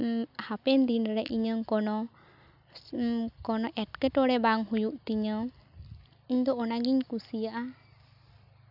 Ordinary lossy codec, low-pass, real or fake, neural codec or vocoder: none; 5.4 kHz; real; none